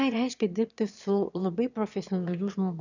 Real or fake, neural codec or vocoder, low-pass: fake; autoencoder, 22.05 kHz, a latent of 192 numbers a frame, VITS, trained on one speaker; 7.2 kHz